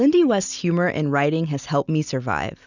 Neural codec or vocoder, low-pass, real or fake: none; 7.2 kHz; real